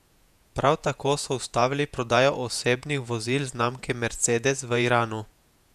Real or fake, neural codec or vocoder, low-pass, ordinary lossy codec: fake; vocoder, 48 kHz, 128 mel bands, Vocos; 14.4 kHz; none